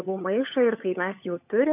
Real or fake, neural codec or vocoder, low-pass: fake; codec, 16 kHz, 16 kbps, FunCodec, trained on LibriTTS, 50 frames a second; 3.6 kHz